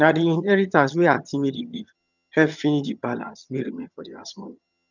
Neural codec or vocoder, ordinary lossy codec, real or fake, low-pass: vocoder, 22.05 kHz, 80 mel bands, HiFi-GAN; none; fake; 7.2 kHz